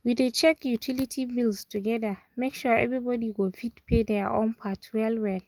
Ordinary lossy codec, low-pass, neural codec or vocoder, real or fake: Opus, 32 kbps; 19.8 kHz; none; real